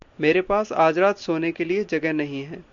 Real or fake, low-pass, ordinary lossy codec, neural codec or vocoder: real; 7.2 kHz; MP3, 64 kbps; none